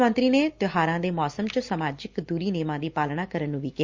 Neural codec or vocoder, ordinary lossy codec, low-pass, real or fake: none; Opus, 32 kbps; 7.2 kHz; real